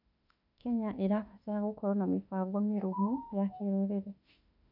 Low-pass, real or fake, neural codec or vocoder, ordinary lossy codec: 5.4 kHz; fake; autoencoder, 48 kHz, 32 numbers a frame, DAC-VAE, trained on Japanese speech; none